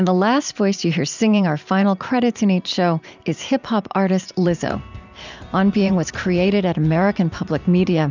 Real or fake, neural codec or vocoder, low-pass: fake; vocoder, 44.1 kHz, 128 mel bands every 512 samples, BigVGAN v2; 7.2 kHz